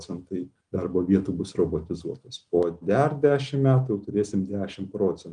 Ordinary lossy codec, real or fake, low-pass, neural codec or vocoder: Opus, 32 kbps; real; 9.9 kHz; none